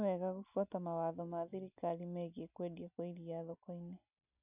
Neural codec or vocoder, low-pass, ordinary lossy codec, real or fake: none; 3.6 kHz; none; real